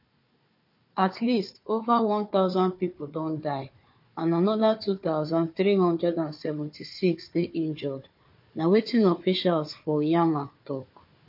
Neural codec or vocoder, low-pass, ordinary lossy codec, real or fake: codec, 16 kHz, 4 kbps, FunCodec, trained on Chinese and English, 50 frames a second; 5.4 kHz; MP3, 32 kbps; fake